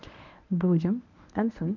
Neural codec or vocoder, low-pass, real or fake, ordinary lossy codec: codec, 24 kHz, 0.9 kbps, WavTokenizer, medium speech release version 1; 7.2 kHz; fake; none